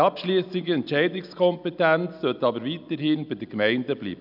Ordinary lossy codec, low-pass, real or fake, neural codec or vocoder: none; 5.4 kHz; real; none